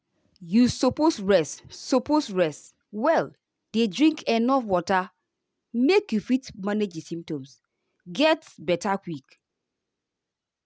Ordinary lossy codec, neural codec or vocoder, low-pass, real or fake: none; none; none; real